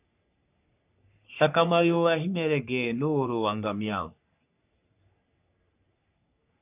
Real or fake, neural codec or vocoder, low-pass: fake; codec, 44.1 kHz, 3.4 kbps, Pupu-Codec; 3.6 kHz